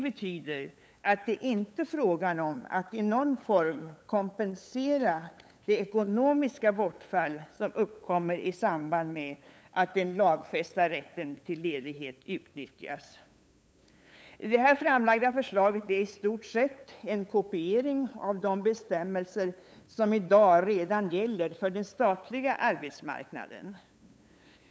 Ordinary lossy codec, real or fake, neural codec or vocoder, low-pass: none; fake; codec, 16 kHz, 8 kbps, FunCodec, trained on LibriTTS, 25 frames a second; none